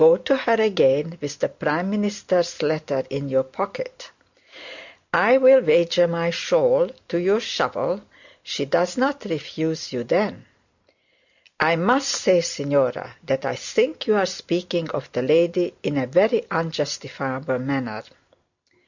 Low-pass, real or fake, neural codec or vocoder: 7.2 kHz; real; none